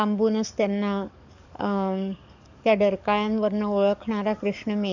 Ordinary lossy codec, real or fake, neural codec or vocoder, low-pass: none; fake; codec, 44.1 kHz, 7.8 kbps, DAC; 7.2 kHz